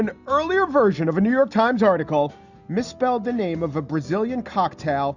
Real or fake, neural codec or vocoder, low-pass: real; none; 7.2 kHz